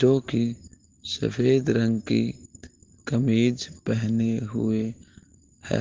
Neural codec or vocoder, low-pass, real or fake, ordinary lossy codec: none; 7.2 kHz; real; Opus, 16 kbps